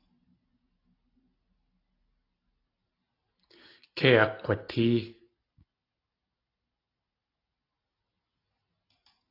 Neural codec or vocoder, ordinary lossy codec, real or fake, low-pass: none; AAC, 32 kbps; real; 5.4 kHz